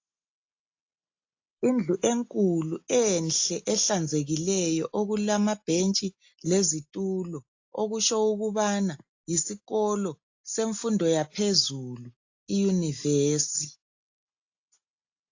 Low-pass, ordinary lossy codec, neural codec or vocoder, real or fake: 7.2 kHz; AAC, 48 kbps; none; real